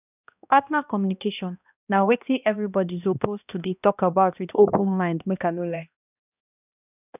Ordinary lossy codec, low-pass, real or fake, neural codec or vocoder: none; 3.6 kHz; fake; codec, 16 kHz, 1 kbps, X-Codec, HuBERT features, trained on balanced general audio